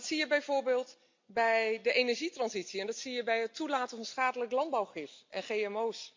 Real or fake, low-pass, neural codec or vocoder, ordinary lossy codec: real; 7.2 kHz; none; MP3, 48 kbps